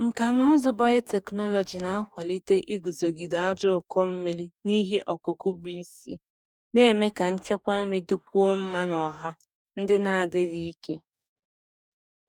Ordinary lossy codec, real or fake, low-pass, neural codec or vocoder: none; fake; 19.8 kHz; codec, 44.1 kHz, 2.6 kbps, DAC